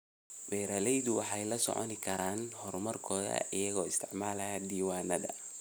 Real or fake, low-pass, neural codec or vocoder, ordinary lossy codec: real; none; none; none